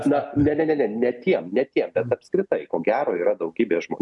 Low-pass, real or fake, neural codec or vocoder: 10.8 kHz; real; none